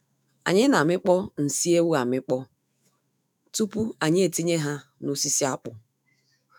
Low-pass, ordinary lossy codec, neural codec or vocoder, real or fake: none; none; autoencoder, 48 kHz, 128 numbers a frame, DAC-VAE, trained on Japanese speech; fake